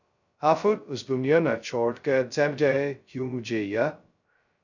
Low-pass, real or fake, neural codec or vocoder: 7.2 kHz; fake; codec, 16 kHz, 0.2 kbps, FocalCodec